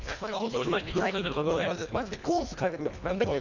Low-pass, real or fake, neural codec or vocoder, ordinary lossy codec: 7.2 kHz; fake; codec, 24 kHz, 1.5 kbps, HILCodec; none